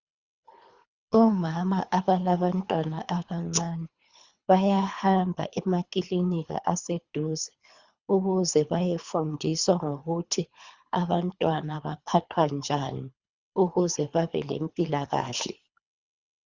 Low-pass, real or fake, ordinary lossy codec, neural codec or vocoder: 7.2 kHz; fake; Opus, 64 kbps; codec, 24 kHz, 3 kbps, HILCodec